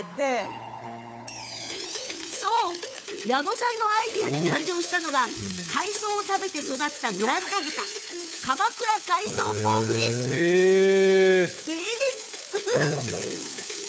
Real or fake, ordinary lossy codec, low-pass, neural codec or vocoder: fake; none; none; codec, 16 kHz, 4 kbps, FunCodec, trained on LibriTTS, 50 frames a second